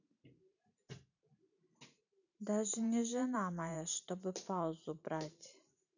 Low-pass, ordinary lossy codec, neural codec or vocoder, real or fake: 7.2 kHz; none; vocoder, 44.1 kHz, 128 mel bands every 512 samples, BigVGAN v2; fake